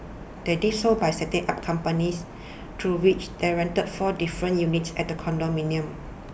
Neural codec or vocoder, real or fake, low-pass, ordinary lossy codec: none; real; none; none